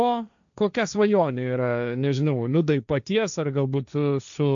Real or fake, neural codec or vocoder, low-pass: fake; codec, 16 kHz, 1.1 kbps, Voila-Tokenizer; 7.2 kHz